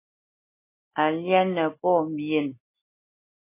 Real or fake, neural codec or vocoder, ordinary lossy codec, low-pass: real; none; MP3, 24 kbps; 3.6 kHz